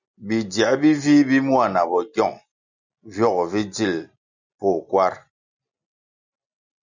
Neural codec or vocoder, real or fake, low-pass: none; real; 7.2 kHz